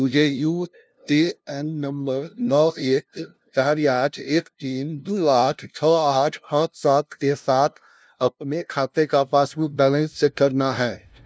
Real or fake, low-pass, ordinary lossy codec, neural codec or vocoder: fake; none; none; codec, 16 kHz, 0.5 kbps, FunCodec, trained on LibriTTS, 25 frames a second